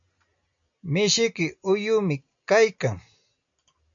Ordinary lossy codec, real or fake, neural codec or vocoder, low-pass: MP3, 64 kbps; real; none; 7.2 kHz